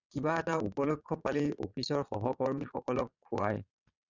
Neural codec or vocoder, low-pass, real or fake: vocoder, 22.05 kHz, 80 mel bands, Vocos; 7.2 kHz; fake